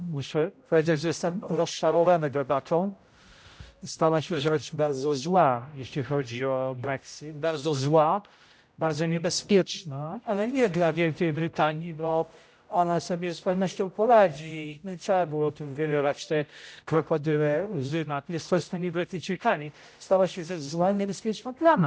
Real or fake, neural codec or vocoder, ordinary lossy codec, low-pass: fake; codec, 16 kHz, 0.5 kbps, X-Codec, HuBERT features, trained on general audio; none; none